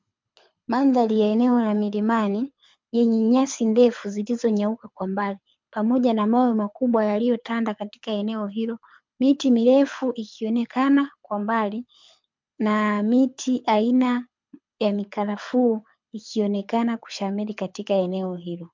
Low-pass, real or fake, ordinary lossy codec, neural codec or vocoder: 7.2 kHz; fake; MP3, 64 kbps; codec, 24 kHz, 6 kbps, HILCodec